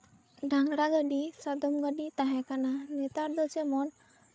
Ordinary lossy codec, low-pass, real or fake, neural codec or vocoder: none; none; fake; codec, 16 kHz, 8 kbps, FreqCodec, larger model